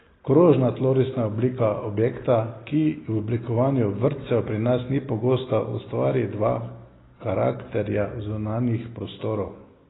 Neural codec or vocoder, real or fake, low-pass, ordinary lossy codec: none; real; 7.2 kHz; AAC, 16 kbps